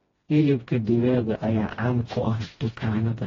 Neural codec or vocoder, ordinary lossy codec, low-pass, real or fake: codec, 16 kHz, 1 kbps, FreqCodec, smaller model; AAC, 24 kbps; 7.2 kHz; fake